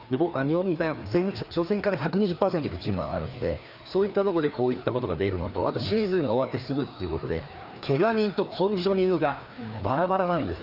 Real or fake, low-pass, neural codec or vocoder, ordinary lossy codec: fake; 5.4 kHz; codec, 16 kHz, 2 kbps, FreqCodec, larger model; none